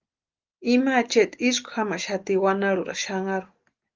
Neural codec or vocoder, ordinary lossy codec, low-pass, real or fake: none; Opus, 32 kbps; 7.2 kHz; real